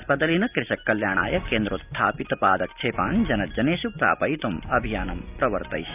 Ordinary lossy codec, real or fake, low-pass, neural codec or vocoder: none; real; 3.6 kHz; none